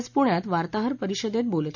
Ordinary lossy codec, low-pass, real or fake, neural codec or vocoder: none; 7.2 kHz; real; none